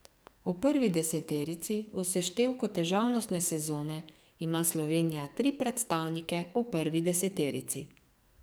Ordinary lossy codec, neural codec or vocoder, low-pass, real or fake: none; codec, 44.1 kHz, 2.6 kbps, SNAC; none; fake